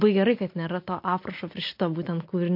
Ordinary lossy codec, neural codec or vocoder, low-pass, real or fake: MP3, 32 kbps; none; 5.4 kHz; real